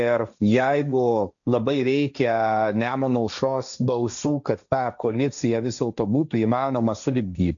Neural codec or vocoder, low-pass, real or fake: codec, 16 kHz, 1.1 kbps, Voila-Tokenizer; 7.2 kHz; fake